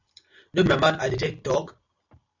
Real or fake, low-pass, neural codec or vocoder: real; 7.2 kHz; none